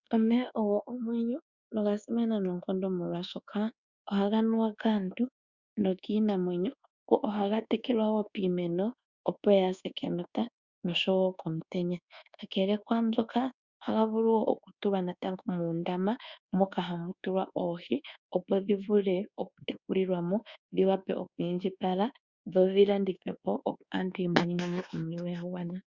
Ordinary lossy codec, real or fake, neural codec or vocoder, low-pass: Opus, 64 kbps; fake; codec, 24 kHz, 1.2 kbps, DualCodec; 7.2 kHz